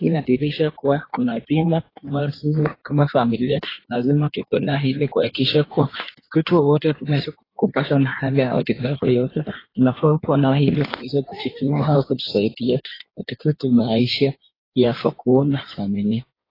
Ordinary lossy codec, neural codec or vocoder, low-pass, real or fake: AAC, 24 kbps; codec, 16 kHz in and 24 kHz out, 1.1 kbps, FireRedTTS-2 codec; 5.4 kHz; fake